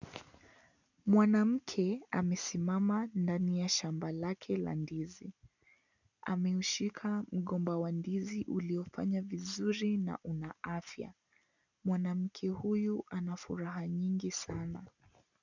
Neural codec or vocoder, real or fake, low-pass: none; real; 7.2 kHz